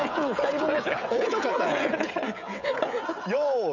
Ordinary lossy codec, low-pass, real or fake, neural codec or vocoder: none; 7.2 kHz; fake; codec, 16 kHz, 16 kbps, FreqCodec, smaller model